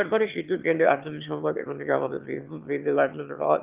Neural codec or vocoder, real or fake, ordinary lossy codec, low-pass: autoencoder, 22.05 kHz, a latent of 192 numbers a frame, VITS, trained on one speaker; fake; Opus, 24 kbps; 3.6 kHz